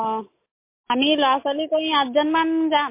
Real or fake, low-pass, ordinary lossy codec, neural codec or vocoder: real; 3.6 kHz; MP3, 24 kbps; none